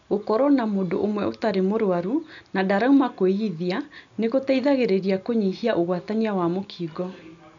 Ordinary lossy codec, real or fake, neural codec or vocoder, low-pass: none; real; none; 7.2 kHz